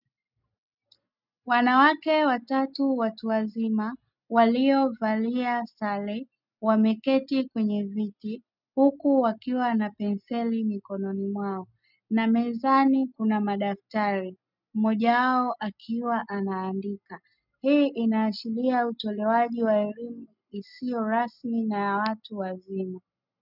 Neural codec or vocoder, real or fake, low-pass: none; real; 5.4 kHz